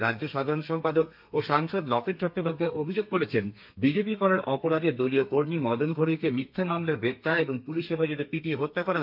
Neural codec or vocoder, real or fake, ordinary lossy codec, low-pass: codec, 32 kHz, 1.9 kbps, SNAC; fake; MP3, 48 kbps; 5.4 kHz